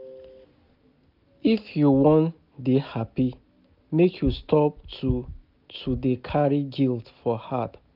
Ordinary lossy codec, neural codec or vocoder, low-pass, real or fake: none; none; 5.4 kHz; real